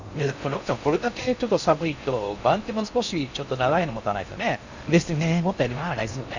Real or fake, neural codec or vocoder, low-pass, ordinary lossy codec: fake; codec, 16 kHz in and 24 kHz out, 0.6 kbps, FocalCodec, streaming, 4096 codes; 7.2 kHz; Opus, 64 kbps